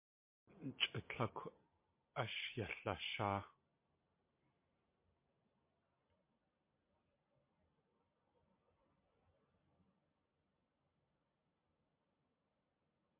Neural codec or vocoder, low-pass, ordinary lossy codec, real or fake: none; 3.6 kHz; MP3, 24 kbps; real